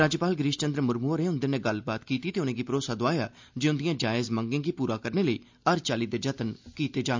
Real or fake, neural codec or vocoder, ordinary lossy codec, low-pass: real; none; MP3, 64 kbps; 7.2 kHz